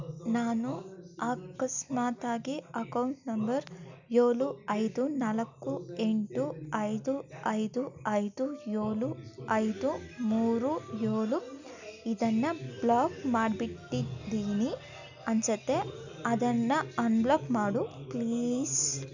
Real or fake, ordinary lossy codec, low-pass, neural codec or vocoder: real; none; 7.2 kHz; none